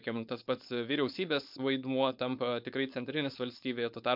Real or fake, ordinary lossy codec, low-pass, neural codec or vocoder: fake; MP3, 48 kbps; 5.4 kHz; codec, 16 kHz, 4.8 kbps, FACodec